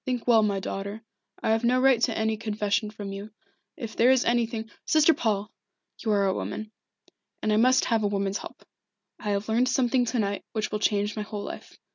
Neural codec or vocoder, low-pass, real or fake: none; 7.2 kHz; real